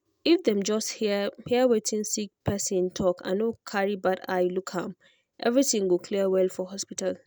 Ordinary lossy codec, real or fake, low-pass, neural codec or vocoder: none; real; none; none